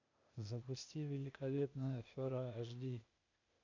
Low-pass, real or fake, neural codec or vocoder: 7.2 kHz; fake; codec, 16 kHz, 0.8 kbps, ZipCodec